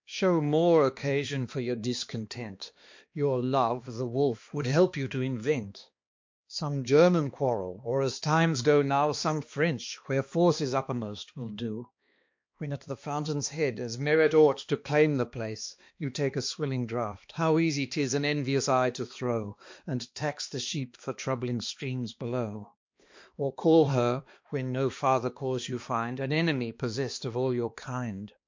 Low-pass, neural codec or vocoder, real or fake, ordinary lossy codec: 7.2 kHz; codec, 16 kHz, 2 kbps, X-Codec, HuBERT features, trained on balanced general audio; fake; MP3, 48 kbps